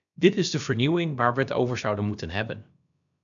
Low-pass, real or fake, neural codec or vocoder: 7.2 kHz; fake; codec, 16 kHz, about 1 kbps, DyCAST, with the encoder's durations